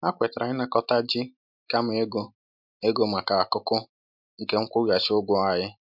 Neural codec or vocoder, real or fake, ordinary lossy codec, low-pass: none; real; MP3, 48 kbps; 5.4 kHz